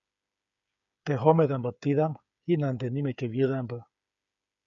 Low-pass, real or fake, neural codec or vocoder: 7.2 kHz; fake; codec, 16 kHz, 8 kbps, FreqCodec, smaller model